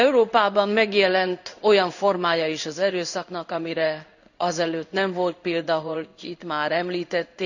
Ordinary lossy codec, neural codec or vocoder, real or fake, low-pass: none; codec, 16 kHz in and 24 kHz out, 1 kbps, XY-Tokenizer; fake; 7.2 kHz